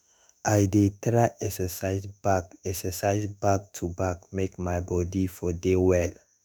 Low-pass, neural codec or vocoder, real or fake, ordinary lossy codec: none; autoencoder, 48 kHz, 32 numbers a frame, DAC-VAE, trained on Japanese speech; fake; none